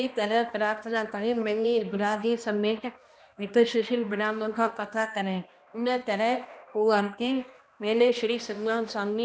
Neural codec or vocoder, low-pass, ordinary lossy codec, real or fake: codec, 16 kHz, 1 kbps, X-Codec, HuBERT features, trained on balanced general audio; none; none; fake